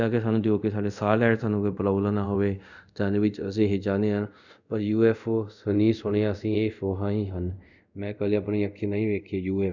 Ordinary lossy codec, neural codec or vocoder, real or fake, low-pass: none; codec, 24 kHz, 0.5 kbps, DualCodec; fake; 7.2 kHz